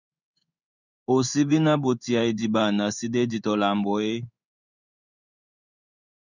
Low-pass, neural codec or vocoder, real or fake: 7.2 kHz; codec, 16 kHz in and 24 kHz out, 1 kbps, XY-Tokenizer; fake